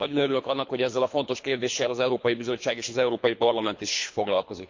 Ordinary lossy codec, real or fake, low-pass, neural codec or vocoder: MP3, 48 kbps; fake; 7.2 kHz; codec, 24 kHz, 3 kbps, HILCodec